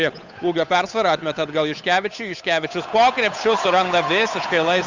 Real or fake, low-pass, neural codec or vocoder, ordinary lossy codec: fake; 7.2 kHz; codec, 16 kHz, 8 kbps, FunCodec, trained on Chinese and English, 25 frames a second; Opus, 64 kbps